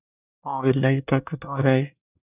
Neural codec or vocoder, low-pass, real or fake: codec, 24 kHz, 1 kbps, SNAC; 3.6 kHz; fake